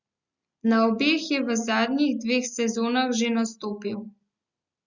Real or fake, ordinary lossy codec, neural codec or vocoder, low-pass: real; Opus, 64 kbps; none; 7.2 kHz